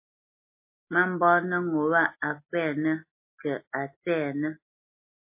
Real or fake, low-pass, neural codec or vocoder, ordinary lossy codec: real; 3.6 kHz; none; MP3, 24 kbps